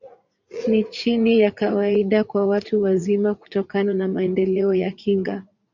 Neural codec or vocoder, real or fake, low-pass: vocoder, 44.1 kHz, 80 mel bands, Vocos; fake; 7.2 kHz